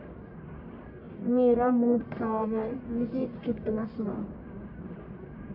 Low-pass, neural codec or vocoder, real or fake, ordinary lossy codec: 5.4 kHz; codec, 44.1 kHz, 1.7 kbps, Pupu-Codec; fake; AAC, 32 kbps